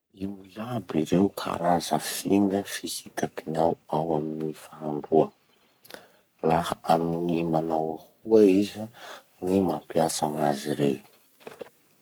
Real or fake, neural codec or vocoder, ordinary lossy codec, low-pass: fake; codec, 44.1 kHz, 3.4 kbps, Pupu-Codec; none; none